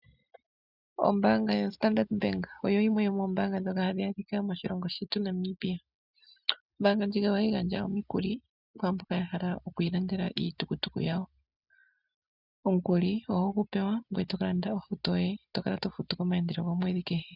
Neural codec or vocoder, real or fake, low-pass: none; real; 5.4 kHz